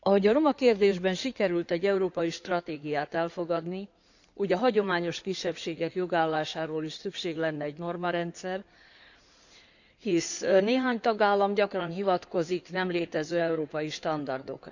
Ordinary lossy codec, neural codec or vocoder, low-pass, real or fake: none; codec, 16 kHz in and 24 kHz out, 2.2 kbps, FireRedTTS-2 codec; 7.2 kHz; fake